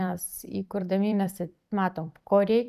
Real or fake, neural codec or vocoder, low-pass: fake; vocoder, 44.1 kHz, 128 mel bands every 256 samples, BigVGAN v2; 14.4 kHz